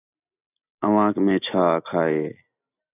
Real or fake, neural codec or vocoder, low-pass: real; none; 3.6 kHz